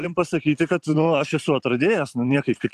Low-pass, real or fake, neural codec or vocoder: 14.4 kHz; fake; vocoder, 44.1 kHz, 128 mel bands every 512 samples, BigVGAN v2